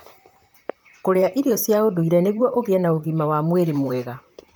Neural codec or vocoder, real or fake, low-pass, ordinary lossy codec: vocoder, 44.1 kHz, 128 mel bands, Pupu-Vocoder; fake; none; none